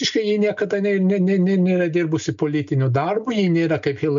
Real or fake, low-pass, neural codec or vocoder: real; 7.2 kHz; none